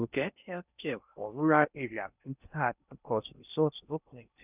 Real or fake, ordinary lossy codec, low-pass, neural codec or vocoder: fake; none; 3.6 kHz; codec, 16 kHz in and 24 kHz out, 0.6 kbps, FocalCodec, streaming, 4096 codes